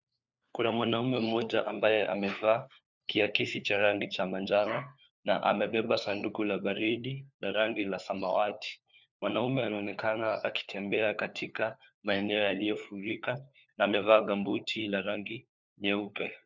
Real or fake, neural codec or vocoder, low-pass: fake; codec, 16 kHz, 4 kbps, FunCodec, trained on LibriTTS, 50 frames a second; 7.2 kHz